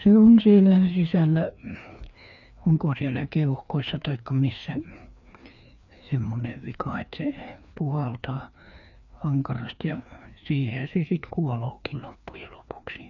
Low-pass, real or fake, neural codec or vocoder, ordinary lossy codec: 7.2 kHz; fake; codec, 16 kHz, 2 kbps, FreqCodec, larger model; none